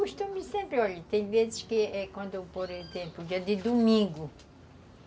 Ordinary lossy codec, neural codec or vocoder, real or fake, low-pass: none; none; real; none